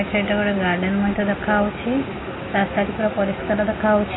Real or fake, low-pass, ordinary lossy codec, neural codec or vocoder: real; 7.2 kHz; AAC, 16 kbps; none